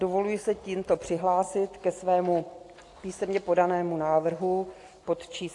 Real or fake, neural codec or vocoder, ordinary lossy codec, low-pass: real; none; AAC, 48 kbps; 10.8 kHz